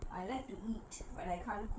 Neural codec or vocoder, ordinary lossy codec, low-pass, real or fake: codec, 16 kHz, 8 kbps, FreqCodec, larger model; none; none; fake